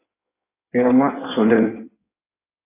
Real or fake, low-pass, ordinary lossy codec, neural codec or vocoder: fake; 3.6 kHz; AAC, 16 kbps; codec, 16 kHz in and 24 kHz out, 1.1 kbps, FireRedTTS-2 codec